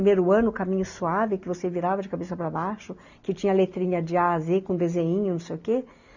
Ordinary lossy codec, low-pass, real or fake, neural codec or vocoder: none; 7.2 kHz; real; none